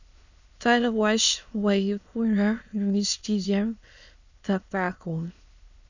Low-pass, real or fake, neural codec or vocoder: 7.2 kHz; fake; autoencoder, 22.05 kHz, a latent of 192 numbers a frame, VITS, trained on many speakers